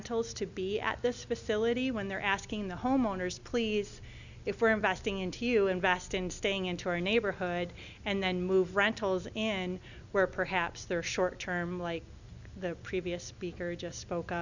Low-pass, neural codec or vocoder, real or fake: 7.2 kHz; none; real